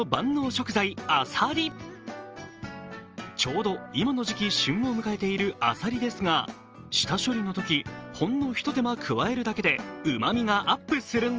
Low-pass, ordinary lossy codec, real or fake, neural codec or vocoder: 7.2 kHz; Opus, 24 kbps; real; none